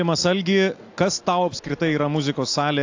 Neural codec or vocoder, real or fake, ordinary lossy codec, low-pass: none; real; AAC, 48 kbps; 7.2 kHz